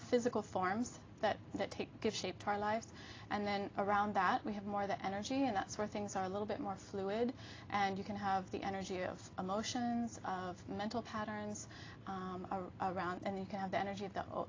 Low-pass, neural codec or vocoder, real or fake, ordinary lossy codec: 7.2 kHz; none; real; AAC, 32 kbps